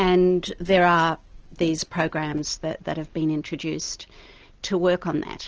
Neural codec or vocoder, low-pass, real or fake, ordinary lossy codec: none; 7.2 kHz; real; Opus, 16 kbps